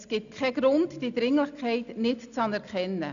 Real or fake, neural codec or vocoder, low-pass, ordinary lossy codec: real; none; 7.2 kHz; none